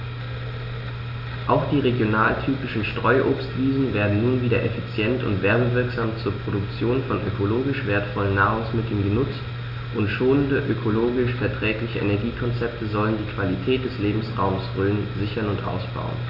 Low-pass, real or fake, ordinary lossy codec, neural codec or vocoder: 5.4 kHz; real; none; none